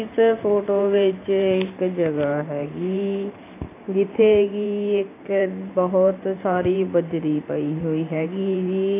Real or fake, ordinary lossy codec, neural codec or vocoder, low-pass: fake; AAC, 24 kbps; vocoder, 44.1 kHz, 128 mel bands every 512 samples, BigVGAN v2; 3.6 kHz